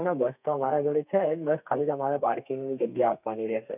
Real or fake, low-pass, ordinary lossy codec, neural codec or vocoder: fake; 3.6 kHz; none; codec, 32 kHz, 1.9 kbps, SNAC